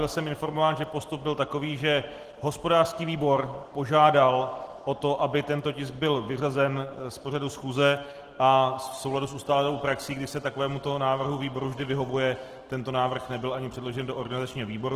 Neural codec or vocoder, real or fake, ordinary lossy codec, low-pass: none; real; Opus, 16 kbps; 14.4 kHz